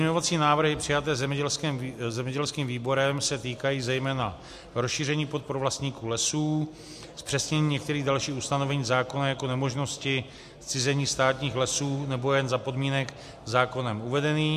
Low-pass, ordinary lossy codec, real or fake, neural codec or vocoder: 14.4 kHz; MP3, 64 kbps; real; none